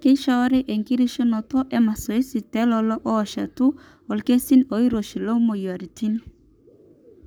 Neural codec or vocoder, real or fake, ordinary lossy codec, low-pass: codec, 44.1 kHz, 7.8 kbps, Pupu-Codec; fake; none; none